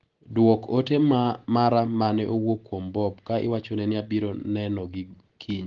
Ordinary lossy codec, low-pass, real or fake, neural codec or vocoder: Opus, 16 kbps; 7.2 kHz; real; none